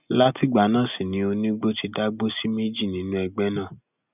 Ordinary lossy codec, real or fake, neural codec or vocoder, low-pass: none; real; none; 3.6 kHz